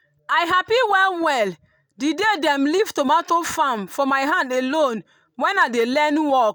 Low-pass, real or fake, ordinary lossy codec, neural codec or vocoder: none; real; none; none